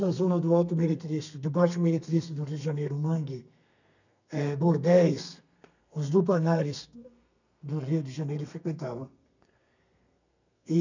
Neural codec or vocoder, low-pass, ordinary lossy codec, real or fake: codec, 32 kHz, 1.9 kbps, SNAC; 7.2 kHz; none; fake